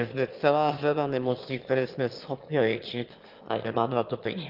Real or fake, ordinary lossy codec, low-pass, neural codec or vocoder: fake; Opus, 32 kbps; 5.4 kHz; autoencoder, 22.05 kHz, a latent of 192 numbers a frame, VITS, trained on one speaker